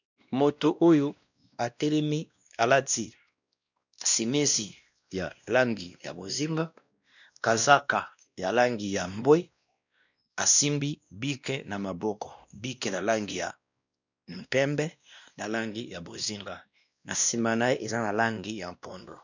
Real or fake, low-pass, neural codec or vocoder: fake; 7.2 kHz; codec, 16 kHz, 1 kbps, X-Codec, WavLM features, trained on Multilingual LibriSpeech